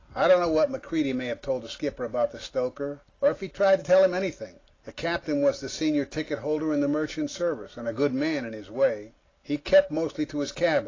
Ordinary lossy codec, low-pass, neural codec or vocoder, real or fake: AAC, 32 kbps; 7.2 kHz; none; real